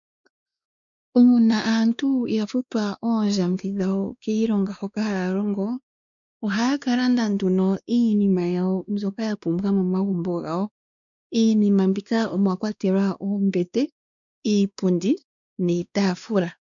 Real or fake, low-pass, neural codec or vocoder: fake; 7.2 kHz; codec, 16 kHz, 2 kbps, X-Codec, WavLM features, trained on Multilingual LibriSpeech